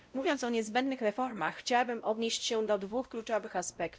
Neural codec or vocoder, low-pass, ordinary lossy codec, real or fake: codec, 16 kHz, 0.5 kbps, X-Codec, WavLM features, trained on Multilingual LibriSpeech; none; none; fake